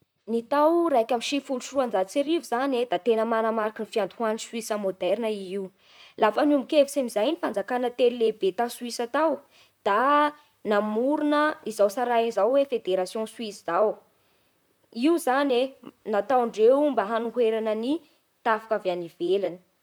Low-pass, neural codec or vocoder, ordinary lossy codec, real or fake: none; vocoder, 44.1 kHz, 128 mel bands, Pupu-Vocoder; none; fake